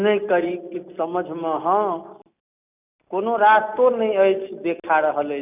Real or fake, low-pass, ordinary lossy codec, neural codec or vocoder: real; 3.6 kHz; none; none